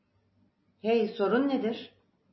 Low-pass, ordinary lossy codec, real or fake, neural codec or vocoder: 7.2 kHz; MP3, 24 kbps; real; none